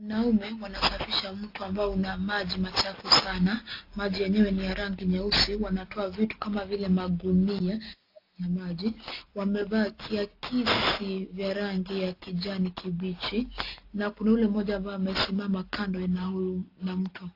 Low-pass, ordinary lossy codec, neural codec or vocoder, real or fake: 5.4 kHz; AAC, 32 kbps; none; real